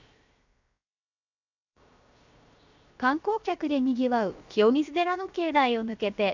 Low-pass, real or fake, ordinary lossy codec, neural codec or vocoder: 7.2 kHz; fake; none; codec, 16 kHz, 0.7 kbps, FocalCodec